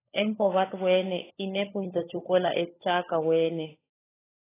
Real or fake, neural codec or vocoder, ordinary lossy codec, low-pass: fake; codec, 16 kHz, 16 kbps, FunCodec, trained on LibriTTS, 50 frames a second; AAC, 16 kbps; 3.6 kHz